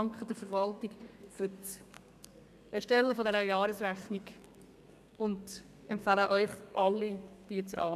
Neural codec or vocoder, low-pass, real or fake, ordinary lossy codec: codec, 32 kHz, 1.9 kbps, SNAC; 14.4 kHz; fake; none